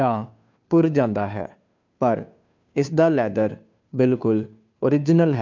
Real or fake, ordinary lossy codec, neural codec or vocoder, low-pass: fake; MP3, 64 kbps; codec, 16 kHz, 2 kbps, FunCodec, trained on Chinese and English, 25 frames a second; 7.2 kHz